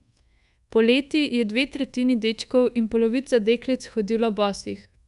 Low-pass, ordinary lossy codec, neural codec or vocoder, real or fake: 10.8 kHz; none; codec, 24 kHz, 1.2 kbps, DualCodec; fake